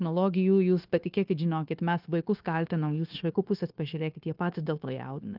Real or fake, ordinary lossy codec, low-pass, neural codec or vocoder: fake; Opus, 24 kbps; 5.4 kHz; codec, 16 kHz, 0.9 kbps, LongCat-Audio-Codec